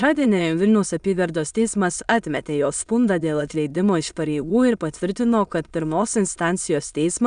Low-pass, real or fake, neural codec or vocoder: 9.9 kHz; fake; autoencoder, 22.05 kHz, a latent of 192 numbers a frame, VITS, trained on many speakers